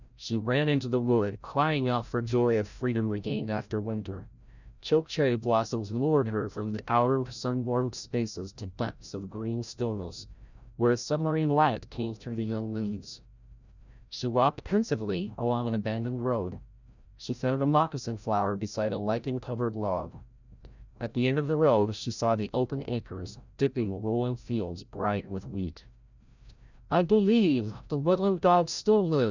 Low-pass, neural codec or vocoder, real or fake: 7.2 kHz; codec, 16 kHz, 0.5 kbps, FreqCodec, larger model; fake